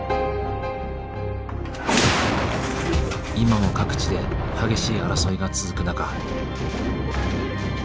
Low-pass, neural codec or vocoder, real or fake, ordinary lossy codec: none; none; real; none